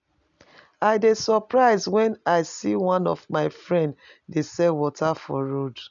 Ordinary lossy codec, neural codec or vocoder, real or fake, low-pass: none; none; real; 7.2 kHz